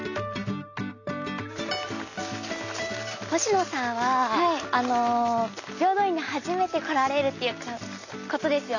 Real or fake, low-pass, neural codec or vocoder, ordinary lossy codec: real; 7.2 kHz; none; none